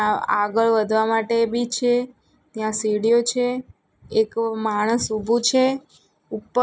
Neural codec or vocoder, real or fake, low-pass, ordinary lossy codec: none; real; none; none